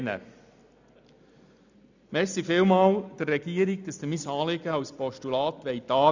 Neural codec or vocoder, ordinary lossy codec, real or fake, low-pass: none; none; real; 7.2 kHz